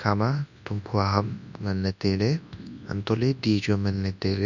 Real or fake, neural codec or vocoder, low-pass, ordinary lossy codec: fake; codec, 24 kHz, 0.9 kbps, WavTokenizer, large speech release; 7.2 kHz; none